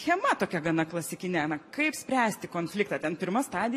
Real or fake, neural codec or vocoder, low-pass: real; none; 14.4 kHz